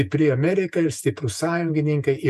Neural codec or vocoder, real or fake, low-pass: vocoder, 48 kHz, 128 mel bands, Vocos; fake; 14.4 kHz